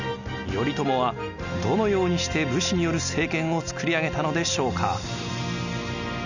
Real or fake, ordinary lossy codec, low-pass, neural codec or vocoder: real; none; 7.2 kHz; none